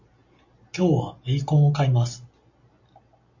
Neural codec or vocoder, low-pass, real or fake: none; 7.2 kHz; real